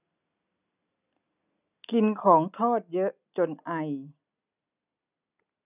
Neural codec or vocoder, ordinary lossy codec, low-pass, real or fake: none; none; 3.6 kHz; real